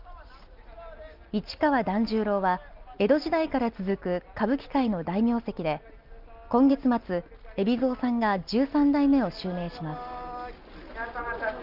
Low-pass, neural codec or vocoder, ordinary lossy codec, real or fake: 5.4 kHz; none; Opus, 32 kbps; real